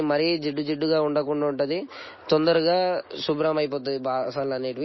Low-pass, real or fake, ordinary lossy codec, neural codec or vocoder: 7.2 kHz; real; MP3, 24 kbps; none